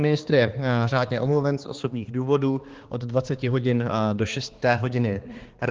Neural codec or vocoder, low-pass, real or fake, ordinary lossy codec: codec, 16 kHz, 2 kbps, X-Codec, HuBERT features, trained on balanced general audio; 7.2 kHz; fake; Opus, 16 kbps